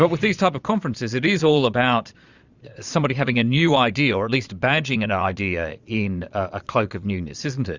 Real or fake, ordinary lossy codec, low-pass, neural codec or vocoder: fake; Opus, 64 kbps; 7.2 kHz; vocoder, 22.05 kHz, 80 mel bands, Vocos